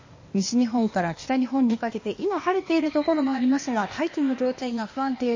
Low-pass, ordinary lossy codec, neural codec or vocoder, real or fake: 7.2 kHz; MP3, 32 kbps; codec, 16 kHz, 0.8 kbps, ZipCodec; fake